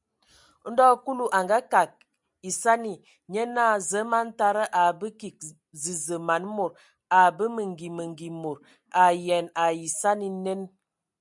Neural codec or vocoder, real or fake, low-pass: none; real; 10.8 kHz